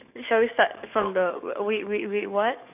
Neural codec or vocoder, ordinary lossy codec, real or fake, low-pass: codec, 44.1 kHz, 7.8 kbps, DAC; none; fake; 3.6 kHz